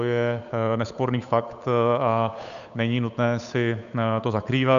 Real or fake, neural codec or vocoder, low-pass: fake; codec, 16 kHz, 8 kbps, FunCodec, trained on Chinese and English, 25 frames a second; 7.2 kHz